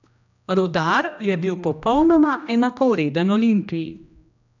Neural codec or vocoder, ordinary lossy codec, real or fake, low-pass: codec, 16 kHz, 1 kbps, X-Codec, HuBERT features, trained on general audio; none; fake; 7.2 kHz